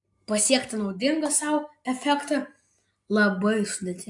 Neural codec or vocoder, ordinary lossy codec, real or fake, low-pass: none; AAC, 64 kbps; real; 10.8 kHz